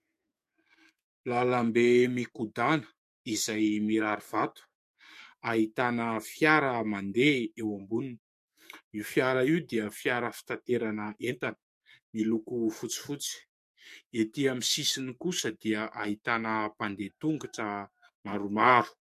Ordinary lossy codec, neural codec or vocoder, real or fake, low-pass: MP3, 64 kbps; codec, 44.1 kHz, 7.8 kbps, DAC; fake; 14.4 kHz